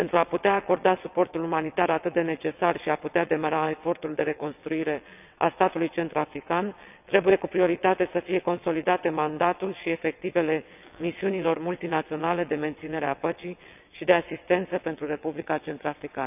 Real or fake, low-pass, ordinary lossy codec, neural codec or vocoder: fake; 3.6 kHz; none; vocoder, 22.05 kHz, 80 mel bands, WaveNeXt